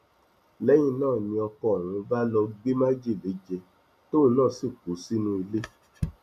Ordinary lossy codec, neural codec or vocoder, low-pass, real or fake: none; none; 14.4 kHz; real